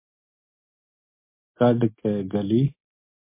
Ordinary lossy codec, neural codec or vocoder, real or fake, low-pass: MP3, 24 kbps; none; real; 3.6 kHz